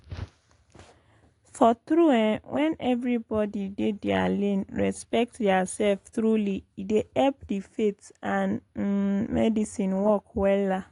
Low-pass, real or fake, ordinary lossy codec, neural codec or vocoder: 10.8 kHz; real; AAC, 48 kbps; none